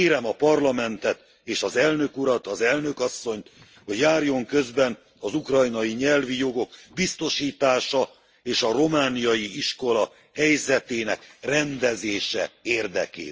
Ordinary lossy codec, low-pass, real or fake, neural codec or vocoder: Opus, 24 kbps; 7.2 kHz; real; none